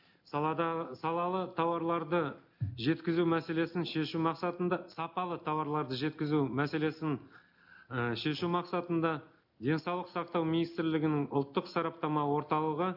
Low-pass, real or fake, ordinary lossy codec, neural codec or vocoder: 5.4 kHz; real; none; none